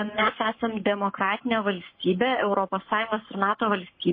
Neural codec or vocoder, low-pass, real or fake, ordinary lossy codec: codec, 44.1 kHz, 7.8 kbps, Pupu-Codec; 5.4 kHz; fake; MP3, 24 kbps